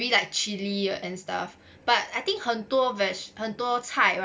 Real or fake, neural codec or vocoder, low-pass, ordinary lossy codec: real; none; none; none